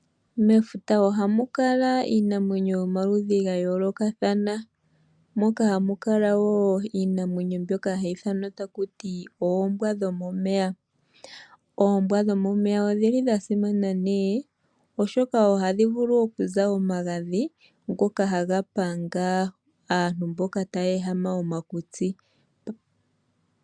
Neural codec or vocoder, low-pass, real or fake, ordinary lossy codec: none; 9.9 kHz; real; MP3, 96 kbps